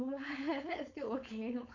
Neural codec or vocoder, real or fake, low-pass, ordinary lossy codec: codec, 16 kHz, 4.8 kbps, FACodec; fake; 7.2 kHz; none